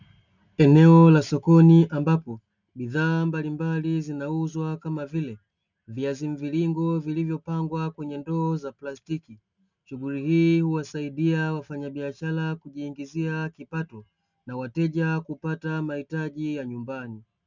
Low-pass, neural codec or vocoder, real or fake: 7.2 kHz; none; real